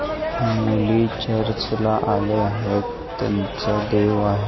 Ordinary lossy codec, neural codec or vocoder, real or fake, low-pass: MP3, 24 kbps; none; real; 7.2 kHz